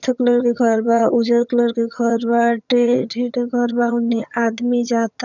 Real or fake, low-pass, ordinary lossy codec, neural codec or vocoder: fake; 7.2 kHz; none; vocoder, 22.05 kHz, 80 mel bands, HiFi-GAN